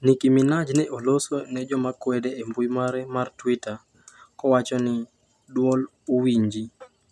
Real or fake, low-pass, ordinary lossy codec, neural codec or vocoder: real; none; none; none